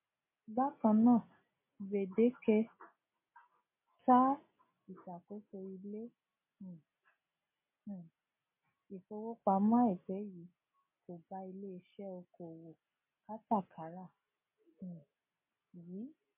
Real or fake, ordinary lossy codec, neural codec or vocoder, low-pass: real; MP3, 24 kbps; none; 3.6 kHz